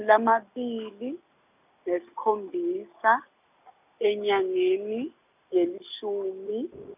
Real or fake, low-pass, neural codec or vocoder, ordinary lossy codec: real; 3.6 kHz; none; none